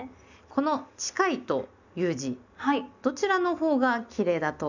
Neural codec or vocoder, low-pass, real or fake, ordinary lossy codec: none; 7.2 kHz; real; none